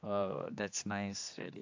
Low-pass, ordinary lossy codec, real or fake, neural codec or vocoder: 7.2 kHz; AAC, 48 kbps; fake; codec, 16 kHz, 2 kbps, X-Codec, HuBERT features, trained on general audio